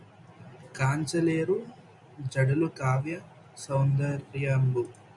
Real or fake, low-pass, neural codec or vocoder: real; 10.8 kHz; none